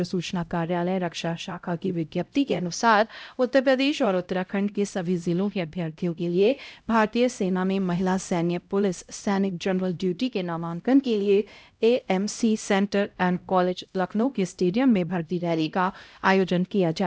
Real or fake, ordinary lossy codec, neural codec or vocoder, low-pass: fake; none; codec, 16 kHz, 0.5 kbps, X-Codec, HuBERT features, trained on LibriSpeech; none